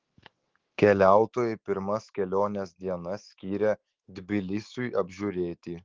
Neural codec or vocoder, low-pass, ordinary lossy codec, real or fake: autoencoder, 48 kHz, 128 numbers a frame, DAC-VAE, trained on Japanese speech; 7.2 kHz; Opus, 16 kbps; fake